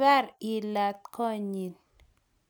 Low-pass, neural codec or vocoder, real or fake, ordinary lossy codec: none; none; real; none